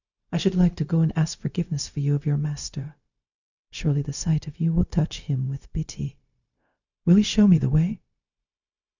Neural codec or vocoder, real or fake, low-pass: codec, 16 kHz, 0.4 kbps, LongCat-Audio-Codec; fake; 7.2 kHz